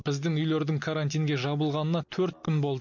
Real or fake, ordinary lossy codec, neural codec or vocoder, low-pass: real; none; none; 7.2 kHz